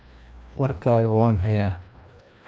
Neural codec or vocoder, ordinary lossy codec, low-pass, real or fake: codec, 16 kHz, 1 kbps, FreqCodec, larger model; none; none; fake